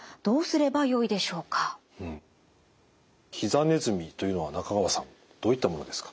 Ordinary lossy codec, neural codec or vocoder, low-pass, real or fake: none; none; none; real